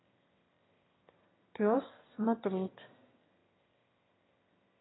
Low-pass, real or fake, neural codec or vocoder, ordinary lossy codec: 7.2 kHz; fake; autoencoder, 22.05 kHz, a latent of 192 numbers a frame, VITS, trained on one speaker; AAC, 16 kbps